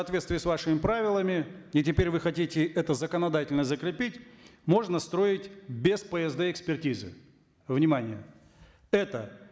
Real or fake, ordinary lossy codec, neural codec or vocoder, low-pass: real; none; none; none